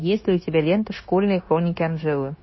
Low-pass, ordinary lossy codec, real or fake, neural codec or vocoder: 7.2 kHz; MP3, 24 kbps; fake; codec, 16 kHz, 0.9 kbps, LongCat-Audio-Codec